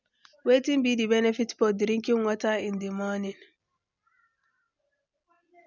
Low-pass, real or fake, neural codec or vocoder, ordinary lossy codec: 7.2 kHz; real; none; none